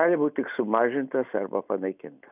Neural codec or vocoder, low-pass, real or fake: none; 3.6 kHz; real